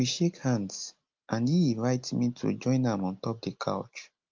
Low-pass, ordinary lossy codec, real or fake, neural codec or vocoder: 7.2 kHz; Opus, 32 kbps; real; none